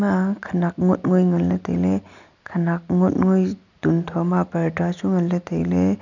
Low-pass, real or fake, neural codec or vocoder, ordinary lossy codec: 7.2 kHz; real; none; none